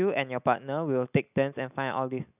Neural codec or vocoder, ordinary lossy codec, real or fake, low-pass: none; none; real; 3.6 kHz